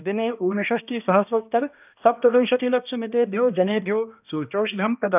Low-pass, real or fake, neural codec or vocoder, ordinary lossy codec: 3.6 kHz; fake; codec, 16 kHz, 1 kbps, X-Codec, HuBERT features, trained on balanced general audio; none